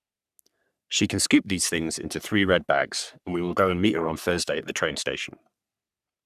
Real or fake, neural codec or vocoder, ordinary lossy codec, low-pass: fake; codec, 44.1 kHz, 3.4 kbps, Pupu-Codec; none; 14.4 kHz